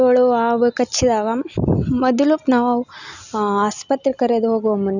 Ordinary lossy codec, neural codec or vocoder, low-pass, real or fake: none; none; 7.2 kHz; real